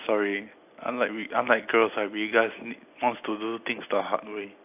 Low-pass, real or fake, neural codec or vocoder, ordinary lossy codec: 3.6 kHz; real; none; AAC, 32 kbps